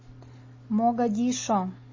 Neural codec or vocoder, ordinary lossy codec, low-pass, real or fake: none; MP3, 32 kbps; 7.2 kHz; real